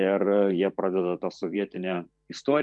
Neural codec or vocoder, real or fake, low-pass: vocoder, 44.1 kHz, 128 mel bands every 512 samples, BigVGAN v2; fake; 10.8 kHz